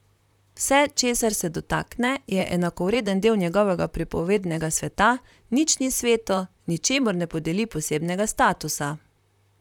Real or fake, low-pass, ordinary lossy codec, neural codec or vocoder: fake; 19.8 kHz; none; vocoder, 44.1 kHz, 128 mel bands, Pupu-Vocoder